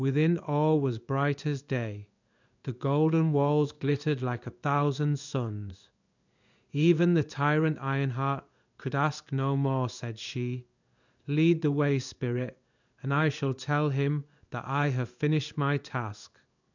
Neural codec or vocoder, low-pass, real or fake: codec, 16 kHz in and 24 kHz out, 1 kbps, XY-Tokenizer; 7.2 kHz; fake